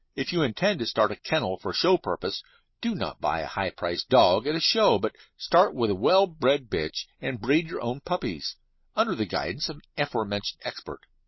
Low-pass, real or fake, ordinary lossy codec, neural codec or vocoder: 7.2 kHz; real; MP3, 24 kbps; none